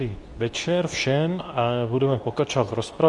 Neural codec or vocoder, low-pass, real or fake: codec, 24 kHz, 0.9 kbps, WavTokenizer, medium speech release version 2; 10.8 kHz; fake